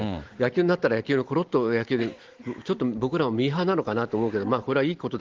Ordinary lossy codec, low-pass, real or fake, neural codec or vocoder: Opus, 16 kbps; 7.2 kHz; real; none